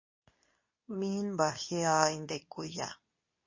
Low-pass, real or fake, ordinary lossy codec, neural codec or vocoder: 7.2 kHz; fake; MP3, 32 kbps; codec, 24 kHz, 0.9 kbps, WavTokenizer, medium speech release version 2